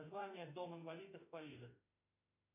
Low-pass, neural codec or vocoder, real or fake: 3.6 kHz; autoencoder, 48 kHz, 32 numbers a frame, DAC-VAE, trained on Japanese speech; fake